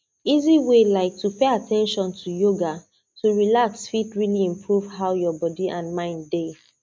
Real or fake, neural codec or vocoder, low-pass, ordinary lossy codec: real; none; none; none